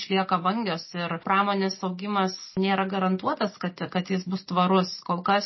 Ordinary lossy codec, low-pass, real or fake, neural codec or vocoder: MP3, 24 kbps; 7.2 kHz; real; none